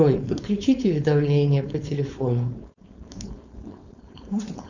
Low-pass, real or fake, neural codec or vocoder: 7.2 kHz; fake; codec, 16 kHz, 4.8 kbps, FACodec